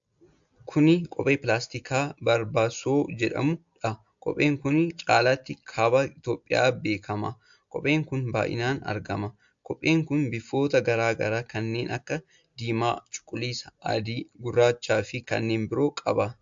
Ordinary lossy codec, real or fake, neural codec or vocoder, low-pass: AAC, 64 kbps; real; none; 7.2 kHz